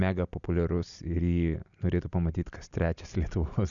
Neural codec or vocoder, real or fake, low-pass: none; real; 7.2 kHz